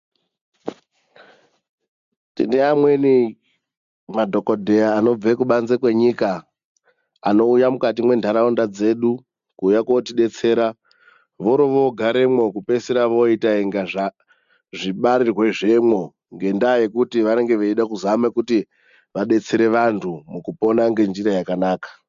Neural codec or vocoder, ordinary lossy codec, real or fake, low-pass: none; MP3, 64 kbps; real; 7.2 kHz